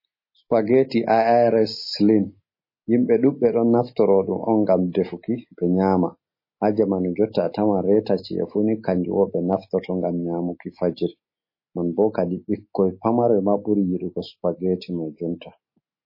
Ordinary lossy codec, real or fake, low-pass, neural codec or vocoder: MP3, 24 kbps; real; 5.4 kHz; none